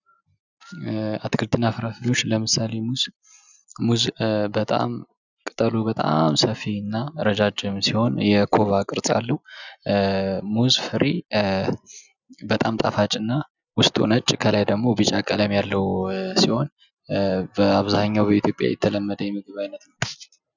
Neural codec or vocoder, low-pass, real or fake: none; 7.2 kHz; real